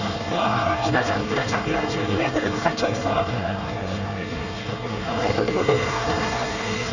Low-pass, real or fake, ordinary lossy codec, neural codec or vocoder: 7.2 kHz; fake; none; codec, 24 kHz, 1 kbps, SNAC